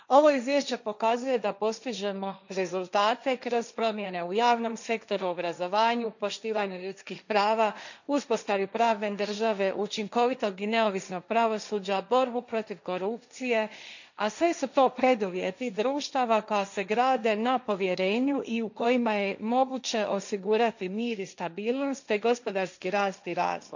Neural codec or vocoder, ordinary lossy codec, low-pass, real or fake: codec, 16 kHz, 1.1 kbps, Voila-Tokenizer; none; 7.2 kHz; fake